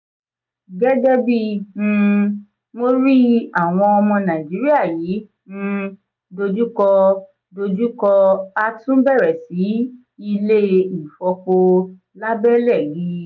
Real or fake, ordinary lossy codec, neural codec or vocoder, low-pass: real; none; none; 7.2 kHz